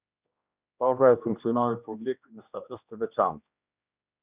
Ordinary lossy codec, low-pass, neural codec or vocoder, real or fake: Opus, 64 kbps; 3.6 kHz; codec, 16 kHz, 1 kbps, X-Codec, HuBERT features, trained on general audio; fake